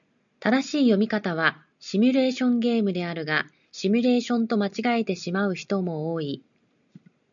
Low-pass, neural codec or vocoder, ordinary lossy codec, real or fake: 7.2 kHz; none; MP3, 96 kbps; real